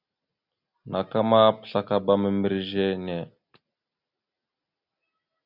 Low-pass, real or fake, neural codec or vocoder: 5.4 kHz; real; none